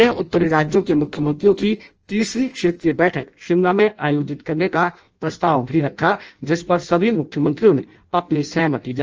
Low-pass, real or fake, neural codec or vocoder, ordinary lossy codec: 7.2 kHz; fake; codec, 16 kHz in and 24 kHz out, 0.6 kbps, FireRedTTS-2 codec; Opus, 24 kbps